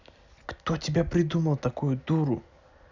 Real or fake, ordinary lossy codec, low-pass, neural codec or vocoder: real; none; 7.2 kHz; none